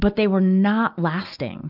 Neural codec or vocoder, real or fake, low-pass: none; real; 5.4 kHz